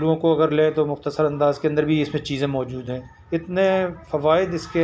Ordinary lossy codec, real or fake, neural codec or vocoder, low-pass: none; real; none; none